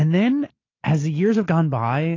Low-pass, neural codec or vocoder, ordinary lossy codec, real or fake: 7.2 kHz; none; AAC, 32 kbps; real